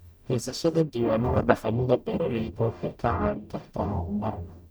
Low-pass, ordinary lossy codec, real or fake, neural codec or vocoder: none; none; fake; codec, 44.1 kHz, 0.9 kbps, DAC